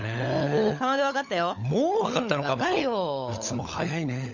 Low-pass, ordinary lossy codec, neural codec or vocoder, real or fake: 7.2 kHz; none; codec, 16 kHz, 16 kbps, FunCodec, trained on LibriTTS, 50 frames a second; fake